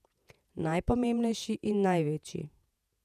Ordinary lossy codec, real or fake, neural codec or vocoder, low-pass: none; fake; vocoder, 48 kHz, 128 mel bands, Vocos; 14.4 kHz